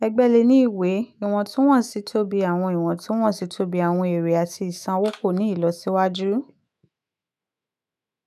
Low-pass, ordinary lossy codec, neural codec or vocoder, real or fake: 14.4 kHz; none; autoencoder, 48 kHz, 128 numbers a frame, DAC-VAE, trained on Japanese speech; fake